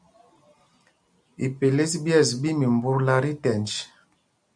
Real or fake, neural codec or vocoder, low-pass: real; none; 9.9 kHz